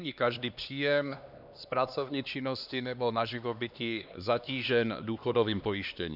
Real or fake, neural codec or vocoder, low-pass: fake; codec, 16 kHz, 2 kbps, X-Codec, HuBERT features, trained on LibriSpeech; 5.4 kHz